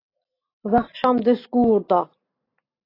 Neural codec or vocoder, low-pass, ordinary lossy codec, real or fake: none; 5.4 kHz; AAC, 24 kbps; real